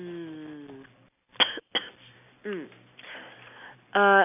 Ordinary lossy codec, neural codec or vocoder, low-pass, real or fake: none; none; 3.6 kHz; real